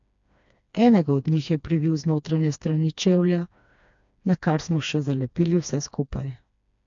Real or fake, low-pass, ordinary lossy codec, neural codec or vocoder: fake; 7.2 kHz; AAC, 64 kbps; codec, 16 kHz, 2 kbps, FreqCodec, smaller model